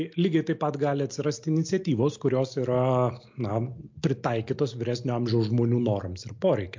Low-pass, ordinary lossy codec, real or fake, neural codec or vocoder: 7.2 kHz; MP3, 48 kbps; real; none